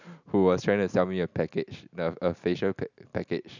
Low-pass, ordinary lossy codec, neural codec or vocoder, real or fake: 7.2 kHz; none; none; real